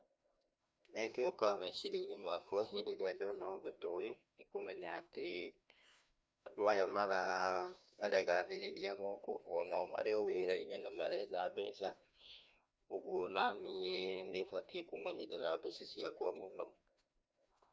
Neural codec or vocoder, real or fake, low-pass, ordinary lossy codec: codec, 16 kHz, 1 kbps, FreqCodec, larger model; fake; none; none